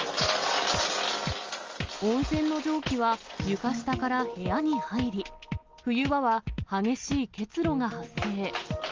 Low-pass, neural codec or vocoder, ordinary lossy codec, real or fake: 7.2 kHz; none; Opus, 32 kbps; real